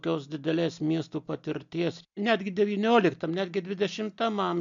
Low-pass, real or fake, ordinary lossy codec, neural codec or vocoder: 7.2 kHz; real; MP3, 64 kbps; none